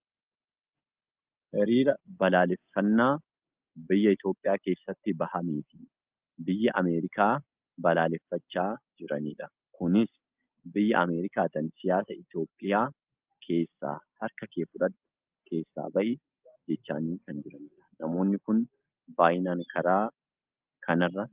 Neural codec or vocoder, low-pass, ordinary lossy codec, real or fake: none; 3.6 kHz; Opus, 24 kbps; real